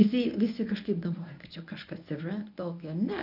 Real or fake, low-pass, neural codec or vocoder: fake; 5.4 kHz; codec, 24 kHz, 0.9 kbps, WavTokenizer, medium speech release version 1